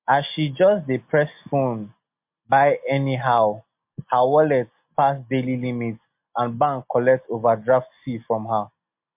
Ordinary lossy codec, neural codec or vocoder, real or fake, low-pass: MP3, 32 kbps; none; real; 3.6 kHz